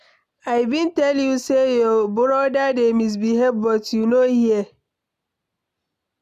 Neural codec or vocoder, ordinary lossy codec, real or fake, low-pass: none; none; real; 14.4 kHz